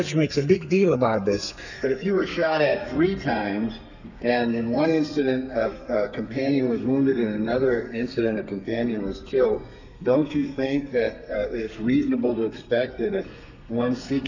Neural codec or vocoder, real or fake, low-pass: codec, 32 kHz, 1.9 kbps, SNAC; fake; 7.2 kHz